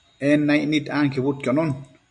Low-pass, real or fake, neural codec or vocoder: 9.9 kHz; real; none